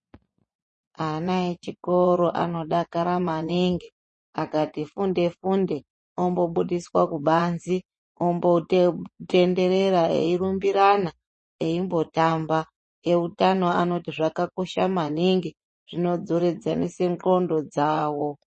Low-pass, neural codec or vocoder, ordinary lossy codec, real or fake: 10.8 kHz; vocoder, 24 kHz, 100 mel bands, Vocos; MP3, 32 kbps; fake